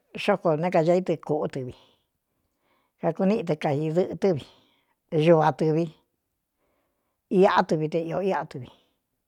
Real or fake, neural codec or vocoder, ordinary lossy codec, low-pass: real; none; none; 19.8 kHz